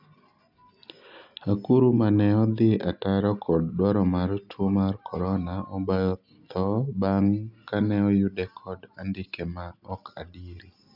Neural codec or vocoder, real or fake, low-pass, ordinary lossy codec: none; real; 5.4 kHz; none